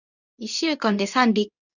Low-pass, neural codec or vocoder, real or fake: 7.2 kHz; codec, 24 kHz, 0.9 kbps, WavTokenizer, medium speech release version 2; fake